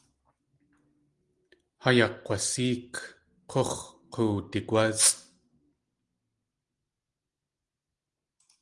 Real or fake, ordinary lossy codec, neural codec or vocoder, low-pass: real; Opus, 24 kbps; none; 10.8 kHz